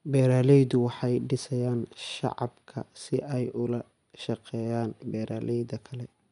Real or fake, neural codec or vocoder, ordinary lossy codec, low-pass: real; none; none; 10.8 kHz